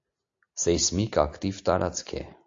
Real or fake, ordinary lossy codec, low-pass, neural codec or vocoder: real; AAC, 48 kbps; 7.2 kHz; none